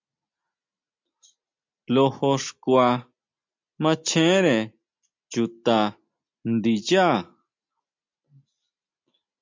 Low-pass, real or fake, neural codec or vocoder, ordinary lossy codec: 7.2 kHz; real; none; MP3, 64 kbps